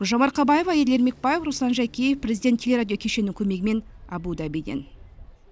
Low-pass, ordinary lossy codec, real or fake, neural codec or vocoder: none; none; real; none